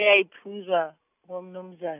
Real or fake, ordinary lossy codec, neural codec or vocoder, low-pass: real; none; none; 3.6 kHz